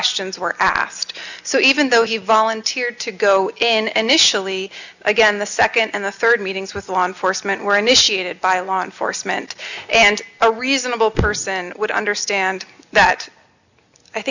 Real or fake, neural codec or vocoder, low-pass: real; none; 7.2 kHz